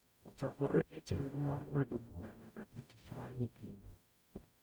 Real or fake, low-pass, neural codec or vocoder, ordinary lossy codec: fake; none; codec, 44.1 kHz, 0.9 kbps, DAC; none